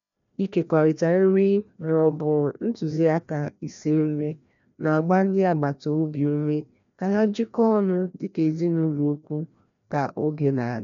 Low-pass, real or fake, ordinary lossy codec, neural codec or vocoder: 7.2 kHz; fake; none; codec, 16 kHz, 1 kbps, FreqCodec, larger model